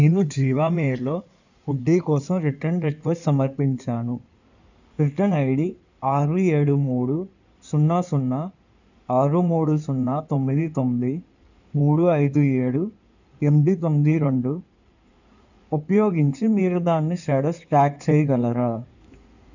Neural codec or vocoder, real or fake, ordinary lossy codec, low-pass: codec, 16 kHz in and 24 kHz out, 2.2 kbps, FireRedTTS-2 codec; fake; none; 7.2 kHz